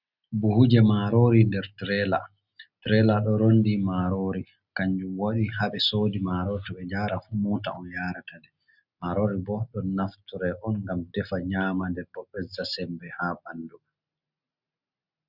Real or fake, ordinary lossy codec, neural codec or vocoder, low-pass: real; Opus, 64 kbps; none; 5.4 kHz